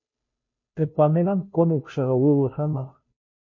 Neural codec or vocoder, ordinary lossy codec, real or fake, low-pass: codec, 16 kHz, 0.5 kbps, FunCodec, trained on Chinese and English, 25 frames a second; MP3, 32 kbps; fake; 7.2 kHz